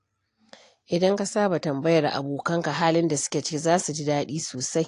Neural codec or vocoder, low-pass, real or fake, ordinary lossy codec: none; 10.8 kHz; real; AAC, 48 kbps